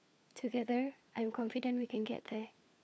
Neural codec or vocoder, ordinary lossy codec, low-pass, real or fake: codec, 16 kHz, 4 kbps, FunCodec, trained on LibriTTS, 50 frames a second; none; none; fake